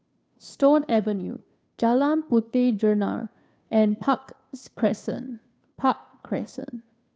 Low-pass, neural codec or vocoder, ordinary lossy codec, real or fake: none; codec, 16 kHz, 2 kbps, FunCodec, trained on Chinese and English, 25 frames a second; none; fake